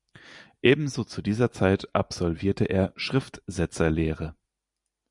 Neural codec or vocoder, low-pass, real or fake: none; 10.8 kHz; real